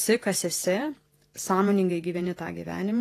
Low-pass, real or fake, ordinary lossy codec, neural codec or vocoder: 14.4 kHz; fake; AAC, 48 kbps; vocoder, 48 kHz, 128 mel bands, Vocos